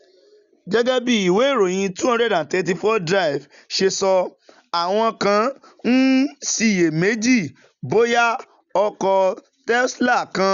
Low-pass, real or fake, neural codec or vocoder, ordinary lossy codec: 7.2 kHz; real; none; none